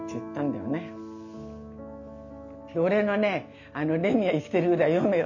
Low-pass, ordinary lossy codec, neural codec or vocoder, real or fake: 7.2 kHz; none; none; real